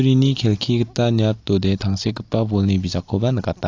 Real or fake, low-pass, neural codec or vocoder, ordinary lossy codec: real; 7.2 kHz; none; AAC, 48 kbps